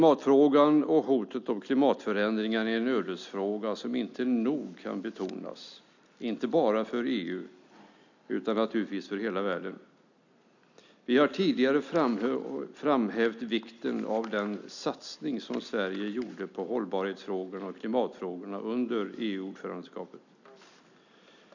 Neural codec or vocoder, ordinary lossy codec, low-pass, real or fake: none; none; 7.2 kHz; real